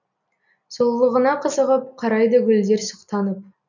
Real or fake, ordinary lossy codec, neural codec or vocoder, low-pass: real; none; none; 7.2 kHz